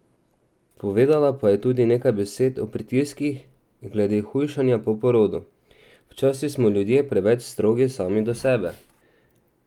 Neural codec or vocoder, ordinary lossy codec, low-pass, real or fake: none; Opus, 32 kbps; 19.8 kHz; real